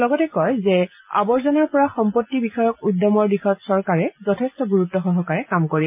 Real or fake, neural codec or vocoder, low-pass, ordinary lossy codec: real; none; 3.6 kHz; MP3, 32 kbps